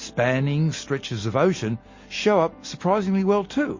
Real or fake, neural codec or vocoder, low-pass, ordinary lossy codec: real; none; 7.2 kHz; MP3, 32 kbps